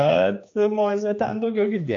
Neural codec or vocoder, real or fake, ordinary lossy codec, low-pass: codec, 16 kHz, 2 kbps, X-Codec, HuBERT features, trained on general audio; fake; AAC, 48 kbps; 7.2 kHz